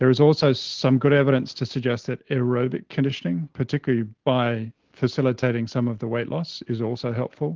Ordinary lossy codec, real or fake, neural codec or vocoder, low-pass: Opus, 16 kbps; real; none; 7.2 kHz